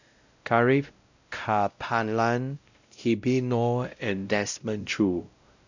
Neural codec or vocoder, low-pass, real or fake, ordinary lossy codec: codec, 16 kHz, 0.5 kbps, X-Codec, WavLM features, trained on Multilingual LibriSpeech; 7.2 kHz; fake; none